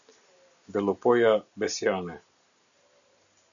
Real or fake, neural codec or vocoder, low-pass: real; none; 7.2 kHz